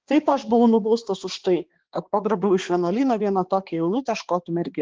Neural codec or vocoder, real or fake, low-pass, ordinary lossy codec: codec, 16 kHz, 2 kbps, X-Codec, HuBERT features, trained on balanced general audio; fake; 7.2 kHz; Opus, 16 kbps